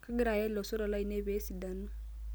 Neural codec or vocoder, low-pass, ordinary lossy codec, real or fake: none; none; none; real